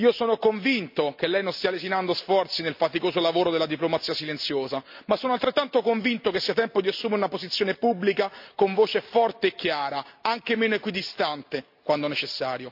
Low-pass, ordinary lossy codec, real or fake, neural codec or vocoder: 5.4 kHz; MP3, 48 kbps; real; none